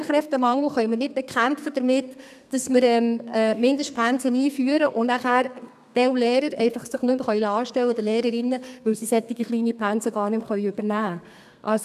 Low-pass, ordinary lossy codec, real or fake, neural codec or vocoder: 14.4 kHz; none; fake; codec, 32 kHz, 1.9 kbps, SNAC